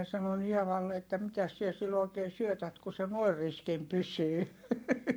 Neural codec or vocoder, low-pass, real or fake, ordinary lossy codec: codec, 44.1 kHz, 7.8 kbps, Pupu-Codec; none; fake; none